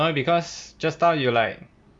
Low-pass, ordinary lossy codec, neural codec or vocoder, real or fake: 9.9 kHz; none; none; real